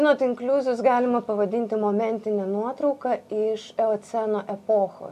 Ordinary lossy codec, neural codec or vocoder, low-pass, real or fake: MP3, 64 kbps; none; 14.4 kHz; real